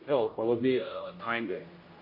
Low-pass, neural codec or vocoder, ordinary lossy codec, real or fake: 5.4 kHz; codec, 16 kHz, 0.5 kbps, X-Codec, HuBERT features, trained on general audio; MP3, 24 kbps; fake